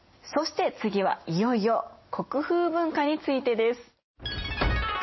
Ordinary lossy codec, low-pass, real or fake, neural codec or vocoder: MP3, 24 kbps; 7.2 kHz; real; none